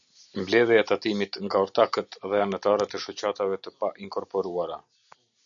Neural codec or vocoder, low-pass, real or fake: none; 7.2 kHz; real